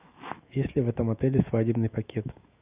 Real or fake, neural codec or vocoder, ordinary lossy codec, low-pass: fake; autoencoder, 48 kHz, 128 numbers a frame, DAC-VAE, trained on Japanese speech; Opus, 64 kbps; 3.6 kHz